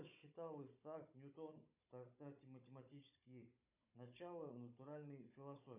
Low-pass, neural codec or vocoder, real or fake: 3.6 kHz; codec, 16 kHz, 16 kbps, FunCodec, trained on Chinese and English, 50 frames a second; fake